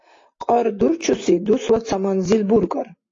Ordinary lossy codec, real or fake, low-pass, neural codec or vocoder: AAC, 32 kbps; real; 7.2 kHz; none